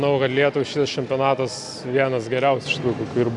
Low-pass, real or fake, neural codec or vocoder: 10.8 kHz; real; none